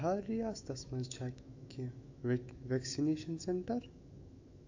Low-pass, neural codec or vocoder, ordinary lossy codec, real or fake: 7.2 kHz; autoencoder, 48 kHz, 128 numbers a frame, DAC-VAE, trained on Japanese speech; AAC, 48 kbps; fake